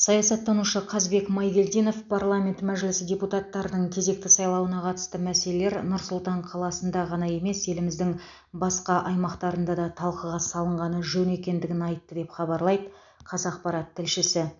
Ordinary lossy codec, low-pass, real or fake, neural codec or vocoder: none; 7.2 kHz; real; none